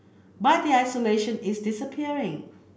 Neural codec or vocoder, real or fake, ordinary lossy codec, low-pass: none; real; none; none